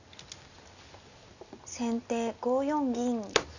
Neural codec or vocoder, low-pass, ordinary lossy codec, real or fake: none; 7.2 kHz; none; real